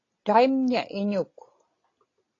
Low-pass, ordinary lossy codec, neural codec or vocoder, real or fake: 7.2 kHz; AAC, 32 kbps; none; real